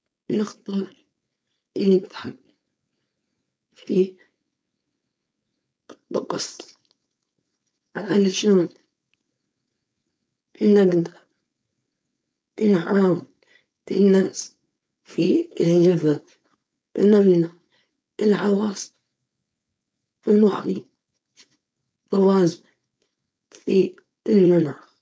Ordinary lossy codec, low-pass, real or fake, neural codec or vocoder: none; none; fake; codec, 16 kHz, 4.8 kbps, FACodec